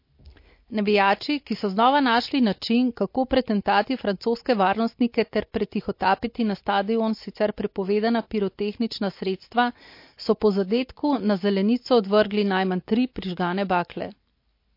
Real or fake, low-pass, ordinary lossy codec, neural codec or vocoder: real; 5.4 kHz; MP3, 32 kbps; none